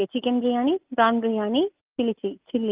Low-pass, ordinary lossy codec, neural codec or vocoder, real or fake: 3.6 kHz; Opus, 32 kbps; none; real